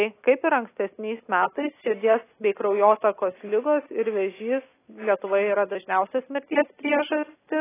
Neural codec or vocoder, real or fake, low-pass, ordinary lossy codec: none; real; 3.6 kHz; AAC, 16 kbps